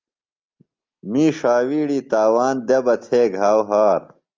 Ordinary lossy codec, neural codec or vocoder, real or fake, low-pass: Opus, 32 kbps; none; real; 7.2 kHz